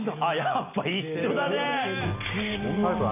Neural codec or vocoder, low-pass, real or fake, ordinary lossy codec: none; 3.6 kHz; real; none